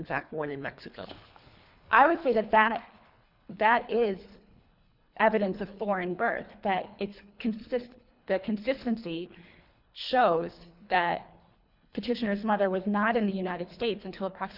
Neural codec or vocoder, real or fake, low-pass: codec, 24 kHz, 3 kbps, HILCodec; fake; 5.4 kHz